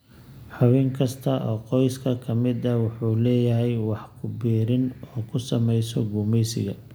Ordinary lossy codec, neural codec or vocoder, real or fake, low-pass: none; none; real; none